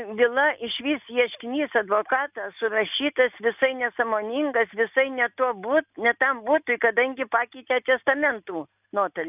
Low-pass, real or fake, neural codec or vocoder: 3.6 kHz; real; none